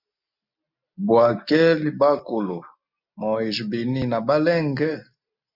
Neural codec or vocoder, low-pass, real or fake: none; 5.4 kHz; real